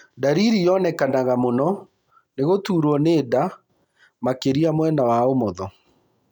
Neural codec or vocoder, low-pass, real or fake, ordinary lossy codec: none; 19.8 kHz; real; none